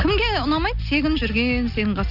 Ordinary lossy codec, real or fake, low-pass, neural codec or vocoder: none; real; 5.4 kHz; none